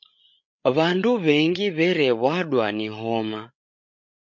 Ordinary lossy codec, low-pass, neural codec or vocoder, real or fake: MP3, 48 kbps; 7.2 kHz; codec, 16 kHz, 8 kbps, FreqCodec, larger model; fake